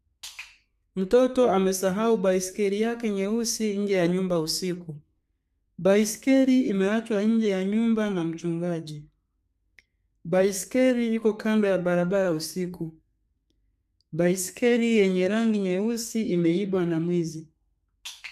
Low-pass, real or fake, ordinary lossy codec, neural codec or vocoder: 14.4 kHz; fake; none; codec, 32 kHz, 1.9 kbps, SNAC